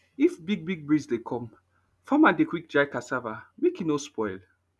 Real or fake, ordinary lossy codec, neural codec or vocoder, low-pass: real; none; none; none